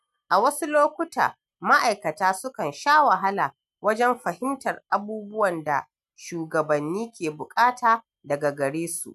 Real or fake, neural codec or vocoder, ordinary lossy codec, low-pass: real; none; none; 14.4 kHz